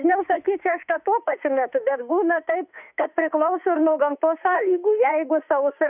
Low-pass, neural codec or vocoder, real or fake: 3.6 kHz; autoencoder, 48 kHz, 32 numbers a frame, DAC-VAE, trained on Japanese speech; fake